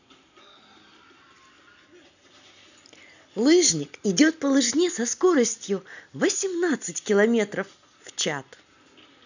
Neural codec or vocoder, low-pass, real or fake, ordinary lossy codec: none; 7.2 kHz; real; none